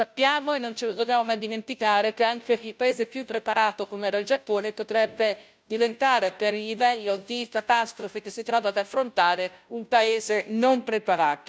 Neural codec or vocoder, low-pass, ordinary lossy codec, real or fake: codec, 16 kHz, 0.5 kbps, FunCodec, trained on Chinese and English, 25 frames a second; none; none; fake